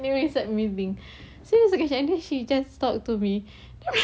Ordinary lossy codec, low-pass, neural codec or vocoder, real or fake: none; none; none; real